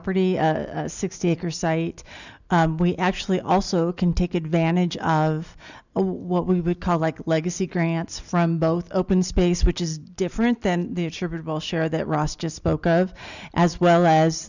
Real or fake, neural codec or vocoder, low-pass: real; none; 7.2 kHz